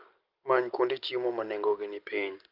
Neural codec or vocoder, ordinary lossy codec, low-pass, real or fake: none; Opus, 32 kbps; 5.4 kHz; real